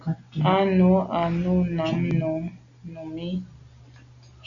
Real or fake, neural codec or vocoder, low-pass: real; none; 7.2 kHz